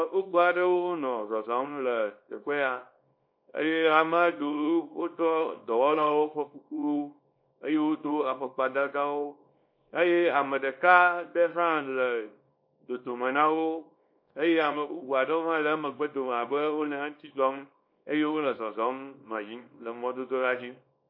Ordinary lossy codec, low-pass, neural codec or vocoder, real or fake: MP3, 32 kbps; 5.4 kHz; codec, 24 kHz, 0.9 kbps, WavTokenizer, small release; fake